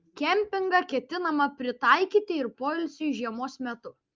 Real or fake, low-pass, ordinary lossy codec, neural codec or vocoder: real; 7.2 kHz; Opus, 24 kbps; none